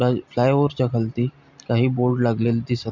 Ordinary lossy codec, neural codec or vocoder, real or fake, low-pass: MP3, 64 kbps; none; real; 7.2 kHz